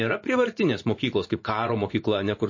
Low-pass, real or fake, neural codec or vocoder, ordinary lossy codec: 7.2 kHz; fake; vocoder, 24 kHz, 100 mel bands, Vocos; MP3, 32 kbps